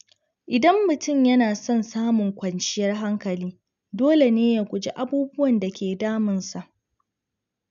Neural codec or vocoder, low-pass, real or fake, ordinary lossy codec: none; 7.2 kHz; real; none